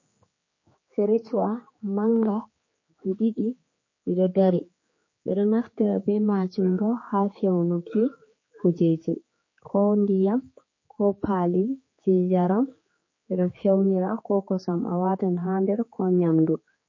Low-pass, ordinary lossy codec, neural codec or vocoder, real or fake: 7.2 kHz; MP3, 32 kbps; codec, 16 kHz, 4 kbps, X-Codec, HuBERT features, trained on general audio; fake